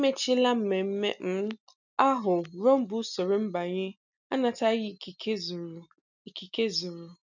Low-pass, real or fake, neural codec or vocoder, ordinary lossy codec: 7.2 kHz; real; none; none